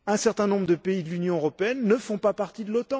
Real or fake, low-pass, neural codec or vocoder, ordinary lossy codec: real; none; none; none